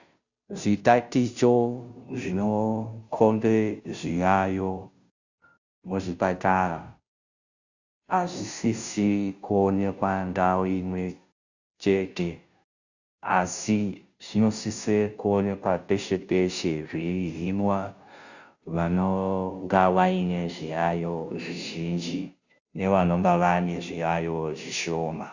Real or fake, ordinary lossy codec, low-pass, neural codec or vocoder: fake; Opus, 64 kbps; 7.2 kHz; codec, 16 kHz, 0.5 kbps, FunCodec, trained on Chinese and English, 25 frames a second